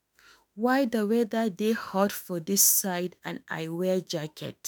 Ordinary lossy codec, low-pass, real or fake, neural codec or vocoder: none; none; fake; autoencoder, 48 kHz, 32 numbers a frame, DAC-VAE, trained on Japanese speech